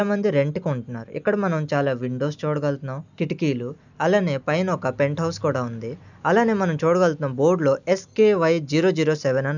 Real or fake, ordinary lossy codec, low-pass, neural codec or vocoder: real; none; 7.2 kHz; none